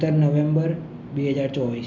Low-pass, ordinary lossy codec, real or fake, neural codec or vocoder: 7.2 kHz; none; real; none